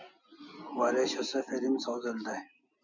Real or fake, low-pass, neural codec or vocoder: real; 7.2 kHz; none